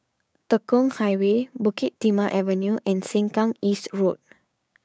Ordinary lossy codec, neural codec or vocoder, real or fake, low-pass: none; codec, 16 kHz, 6 kbps, DAC; fake; none